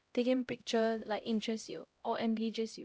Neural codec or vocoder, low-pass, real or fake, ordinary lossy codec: codec, 16 kHz, 0.5 kbps, X-Codec, HuBERT features, trained on LibriSpeech; none; fake; none